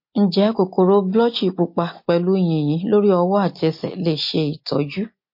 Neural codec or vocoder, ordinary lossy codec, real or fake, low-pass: none; MP3, 32 kbps; real; 5.4 kHz